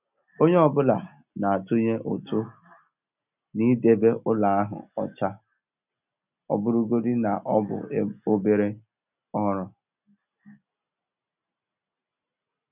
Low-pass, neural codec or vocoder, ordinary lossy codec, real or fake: 3.6 kHz; none; none; real